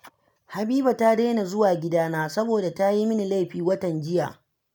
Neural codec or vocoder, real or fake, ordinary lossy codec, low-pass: none; real; none; none